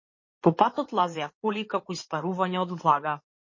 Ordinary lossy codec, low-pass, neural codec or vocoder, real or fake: MP3, 32 kbps; 7.2 kHz; codec, 16 kHz in and 24 kHz out, 2.2 kbps, FireRedTTS-2 codec; fake